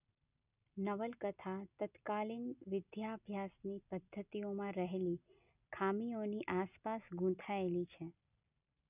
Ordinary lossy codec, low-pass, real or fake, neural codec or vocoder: none; 3.6 kHz; real; none